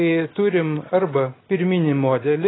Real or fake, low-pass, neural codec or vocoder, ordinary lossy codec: real; 7.2 kHz; none; AAC, 16 kbps